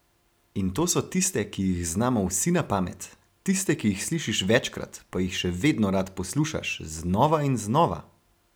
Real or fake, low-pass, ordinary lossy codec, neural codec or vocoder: real; none; none; none